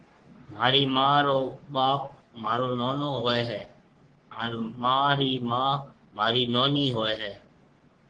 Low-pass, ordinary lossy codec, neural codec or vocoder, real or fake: 9.9 kHz; Opus, 16 kbps; codec, 44.1 kHz, 3.4 kbps, Pupu-Codec; fake